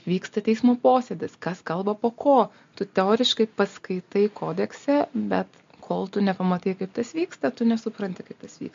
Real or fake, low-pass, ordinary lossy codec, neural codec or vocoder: real; 7.2 kHz; MP3, 48 kbps; none